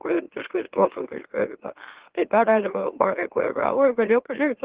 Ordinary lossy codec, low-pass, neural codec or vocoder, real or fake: Opus, 16 kbps; 3.6 kHz; autoencoder, 44.1 kHz, a latent of 192 numbers a frame, MeloTTS; fake